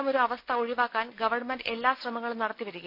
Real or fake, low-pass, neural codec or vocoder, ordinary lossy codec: real; 5.4 kHz; none; none